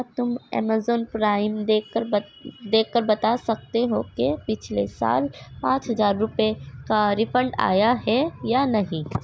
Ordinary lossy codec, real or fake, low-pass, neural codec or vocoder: none; real; none; none